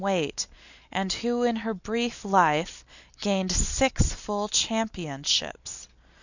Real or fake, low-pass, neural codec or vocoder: real; 7.2 kHz; none